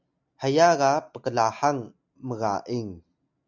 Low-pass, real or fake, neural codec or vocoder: 7.2 kHz; real; none